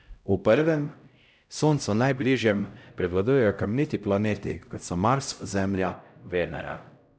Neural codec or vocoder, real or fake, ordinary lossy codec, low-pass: codec, 16 kHz, 0.5 kbps, X-Codec, HuBERT features, trained on LibriSpeech; fake; none; none